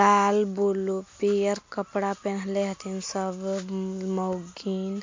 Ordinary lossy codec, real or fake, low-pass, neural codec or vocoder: MP3, 48 kbps; real; 7.2 kHz; none